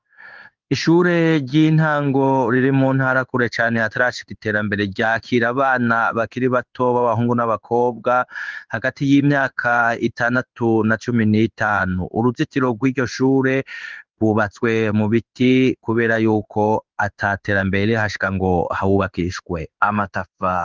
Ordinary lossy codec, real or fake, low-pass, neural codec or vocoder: Opus, 32 kbps; fake; 7.2 kHz; codec, 16 kHz in and 24 kHz out, 1 kbps, XY-Tokenizer